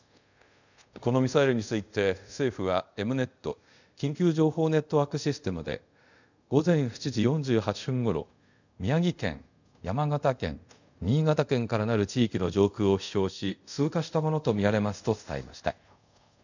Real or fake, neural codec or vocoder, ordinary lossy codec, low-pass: fake; codec, 24 kHz, 0.5 kbps, DualCodec; none; 7.2 kHz